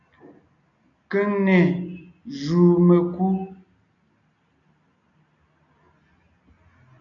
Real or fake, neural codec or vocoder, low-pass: real; none; 7.2 kHz